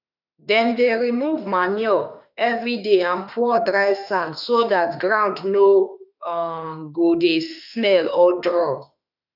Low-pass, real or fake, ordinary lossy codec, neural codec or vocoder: 5.4 kHz; fake; none; autoencoder, 48 kHz, 32 numbers a frame, DAC-VAE, trained on Japanese speech